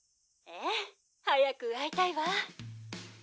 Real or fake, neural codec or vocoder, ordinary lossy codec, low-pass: real; none; none; none